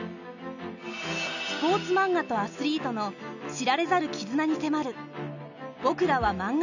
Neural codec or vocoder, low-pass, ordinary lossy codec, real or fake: none; 7.2 kHz; none; real